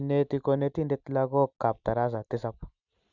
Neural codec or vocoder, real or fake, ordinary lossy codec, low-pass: none; real; none; 7.2 kHz